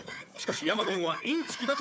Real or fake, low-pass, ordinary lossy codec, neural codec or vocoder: fake; none; none; codec, 16 kHz, 4 kbps, FunCodec, trained on Chinese and English, 50 frames a second